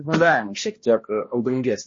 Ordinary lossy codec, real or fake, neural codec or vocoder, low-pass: MP3, 32 kbps; fake; codec, 16 kHz, 1 kbps, X-Codec, HuBERT features, trained on balanced general audio; 7.2 kHz